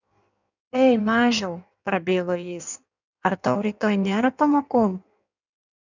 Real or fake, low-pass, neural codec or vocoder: fake; 7.2 kHz; codec, 16 kHz in and 24 kHz out, 1.1 kbps, FireRedTTS-2 codec